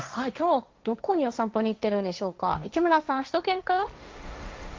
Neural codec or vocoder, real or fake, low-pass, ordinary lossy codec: codec, 16 kHz, 1.1 kbps, Voila-Tokenizer; fake; 7.2 kHz; Opus, 32 kbps